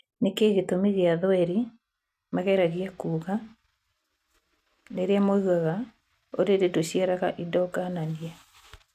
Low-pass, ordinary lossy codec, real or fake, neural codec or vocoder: 14.4 kHz; none; real; none